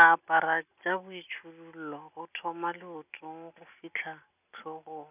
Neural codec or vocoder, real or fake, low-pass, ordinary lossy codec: none; real; 3.6 kHz; none